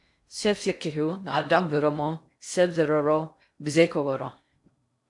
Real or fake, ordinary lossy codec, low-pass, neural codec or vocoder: fake; AAC, 64 kbps; 10.8 kHz; codec, 16 kHz in and 24 kHz out, 0.6 kbps, FocalCodec, streaming, 2048 codes